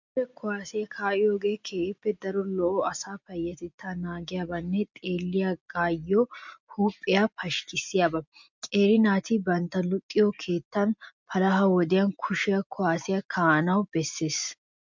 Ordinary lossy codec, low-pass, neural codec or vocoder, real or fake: MP3, 64 kbps; 7.2 kHz; none; real